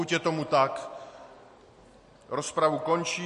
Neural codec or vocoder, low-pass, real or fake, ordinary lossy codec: none; 14.4 kHz; real; MP3, 48 kbps